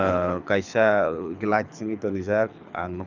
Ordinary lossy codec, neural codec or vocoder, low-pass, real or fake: none; codec, 24 kHz, 6 kbps, HILCodec; 7.2 kHz; fake